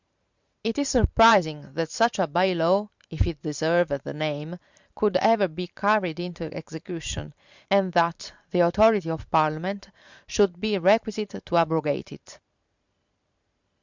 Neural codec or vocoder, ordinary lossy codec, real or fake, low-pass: vocoder, 44.1 kHz, 128 mel bands every 512 samples, BigVGAN v2; Opus, 64 kbps; fake; 7.2 kHz